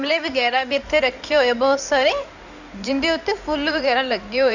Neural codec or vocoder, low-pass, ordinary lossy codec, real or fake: codec, 16 kHz in and 24 kHz out, 2.2 kbps, FireRedTTS-2 codec; 7.2 kHz; none; fake